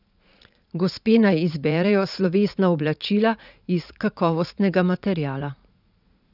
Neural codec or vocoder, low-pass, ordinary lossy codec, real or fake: vocoder, 44.1 kHz, 80 mel bands, Vocos; 5.4 kHz; none; fake